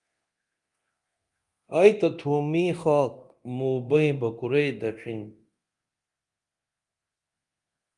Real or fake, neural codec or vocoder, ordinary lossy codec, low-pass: fake; codec, 24 kHz, 0.9 kbps, DualCodec; Opus, 32 kbps; 10.8 kHz